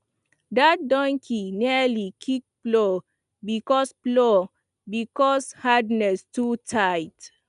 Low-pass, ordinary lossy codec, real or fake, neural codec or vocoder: 10.8 kHz; none; real; none